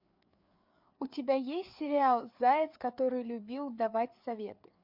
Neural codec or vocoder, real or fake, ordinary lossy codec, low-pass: codec, 16 kHz, 4 kbps, FreqCodec, larger model; fake; MP3, 48 kbps; 5.4 kHz